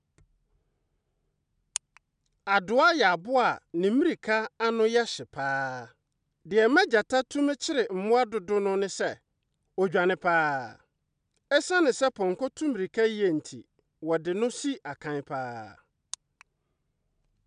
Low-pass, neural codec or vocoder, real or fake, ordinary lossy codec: 9.9 kHz; none; real; none